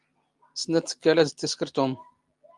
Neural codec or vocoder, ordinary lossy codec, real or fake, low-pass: none; Opus, 32 kbps; real; 10.8 kHz